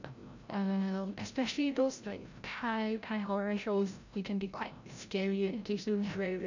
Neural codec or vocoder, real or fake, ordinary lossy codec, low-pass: codec, 16 kHz, 0.5 kbps, FreqCodec, larger model; fake; none; 7.2 kHz